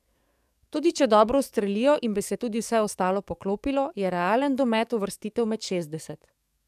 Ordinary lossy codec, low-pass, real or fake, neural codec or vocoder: none; 14.4 kHz; fake; codec, 44.1 kHz, 7.8 kbps, DAC